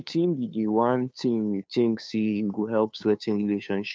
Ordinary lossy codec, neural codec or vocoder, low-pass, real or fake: none; codec, 16 kHz, 2 kbps, FunCodec, trained on Chinese and English, 25 frames a second; none; fake